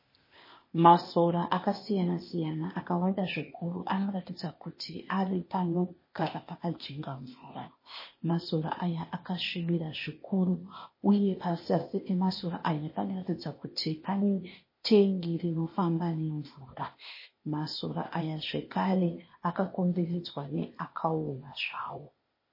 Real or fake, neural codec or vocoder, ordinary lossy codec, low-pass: fake; codec, 16 kHz, 0.8 kbps, ZipCodec; MP3, 24 kbps; 5.4 kHz